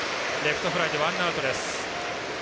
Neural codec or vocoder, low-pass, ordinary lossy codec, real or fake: none; none; none; real